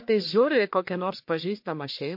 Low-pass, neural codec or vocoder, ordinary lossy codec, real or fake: 5.4 kHz; codec, 44.1 kHz, 1.7 kbps, Pupu-Codec; MP3, 32 kbps; fake